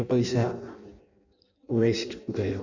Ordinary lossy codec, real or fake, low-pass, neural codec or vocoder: none; fake; 7.2 kHz; codec, 16 kHz in and 24 kHz out, 0.6 kbps, FireRedTTS-2 codec